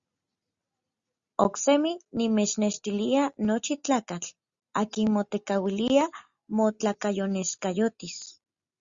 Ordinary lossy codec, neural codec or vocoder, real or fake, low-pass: Opus, 64 kbps; none; real; 7.2 kHz